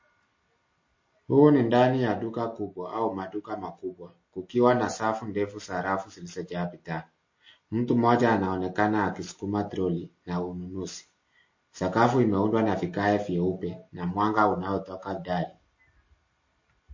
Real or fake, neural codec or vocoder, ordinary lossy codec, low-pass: real; none; MP3, 32 kbps; 7.2 kHz